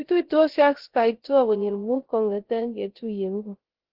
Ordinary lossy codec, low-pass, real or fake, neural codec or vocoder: Opus, 16 kbps; 5.4 kHz; fake; codec, 16 kHz, 0.3 kbps, FocalCodec